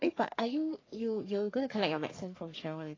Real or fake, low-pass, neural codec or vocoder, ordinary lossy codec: fake; 7.2 kHz; codec, 44.1 kHz, 3.4 kbps, Pupu-Codec; AAC, 32 kbps